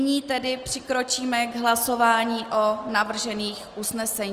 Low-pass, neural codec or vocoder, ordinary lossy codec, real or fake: 14.4 kHz; none; Opus, 24 kbps; real